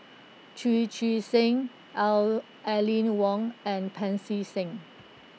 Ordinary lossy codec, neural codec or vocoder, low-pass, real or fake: none; none; none; real